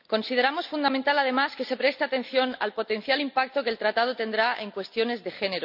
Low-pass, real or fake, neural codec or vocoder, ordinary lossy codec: 5.4 kHz; real; none; none